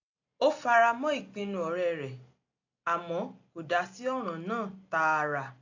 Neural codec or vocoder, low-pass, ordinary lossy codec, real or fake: none; 7.2 kHz; AAC, 48 kbps; real